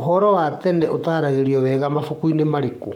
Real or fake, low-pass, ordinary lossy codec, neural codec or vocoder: fake; 19.8 kHz; none; codec, 44.1 kHz, 7.8 kbps, Pupu-Codec